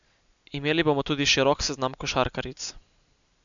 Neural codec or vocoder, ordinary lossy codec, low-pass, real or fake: none; none; 7.2 kHz; real